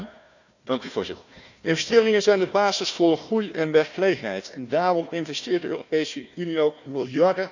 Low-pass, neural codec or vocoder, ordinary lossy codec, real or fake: 7.2 kHz; codec, 16 kHz, 1 kbps, FunCodec, trained on Chinese and English, 50 frames a second; none; fake